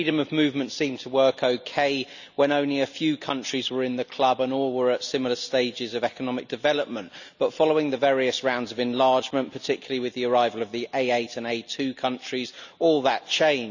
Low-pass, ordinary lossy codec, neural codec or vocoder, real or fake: 7.2 kHz; none; none; real